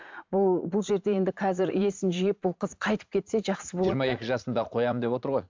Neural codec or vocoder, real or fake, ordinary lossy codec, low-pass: vocoder, 44.1 kHz, 80 mel bands, Vocos; fake; MP3, 64 kbps; 7.2 kHz